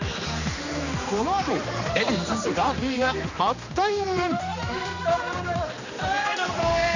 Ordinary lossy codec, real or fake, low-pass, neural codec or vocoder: none; fake; 7.2 kHz; codec, 16 kHz, 2 kbps, X-Codec, HuBERT features, trained on general audio